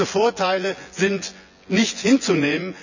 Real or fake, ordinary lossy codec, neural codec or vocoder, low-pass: fake; none; vocoder, 24 kHz, 100 mel bands, Vocos; 7.2 kHz